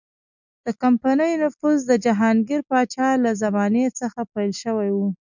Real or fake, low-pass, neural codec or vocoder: real; 7.2 kHz; none